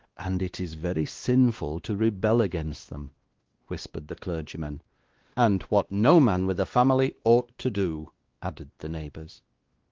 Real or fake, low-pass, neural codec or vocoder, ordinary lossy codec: fake; 7.2 kHz; codec, 16 kHz, 2 kbps, X-Codec, WavLM features, trained on Multilingual LibriSpeech; Opus, 16 kbps